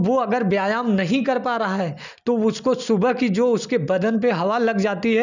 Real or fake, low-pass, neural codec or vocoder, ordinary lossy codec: real; 7.2 kHz; none; none